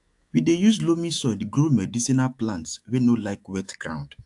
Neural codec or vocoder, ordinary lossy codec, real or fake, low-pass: autoencoder, 48 kHz, 128 numbers a frame, DAC-VAE, trained on Japanese speech; AAC, 64 kbps; fake; 10.8 kHz